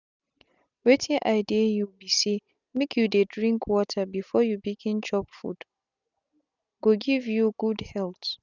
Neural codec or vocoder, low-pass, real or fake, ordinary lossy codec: none; 7.2 kHz; real; none